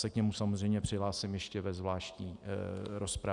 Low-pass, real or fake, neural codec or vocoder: 10.8 kHz; real; none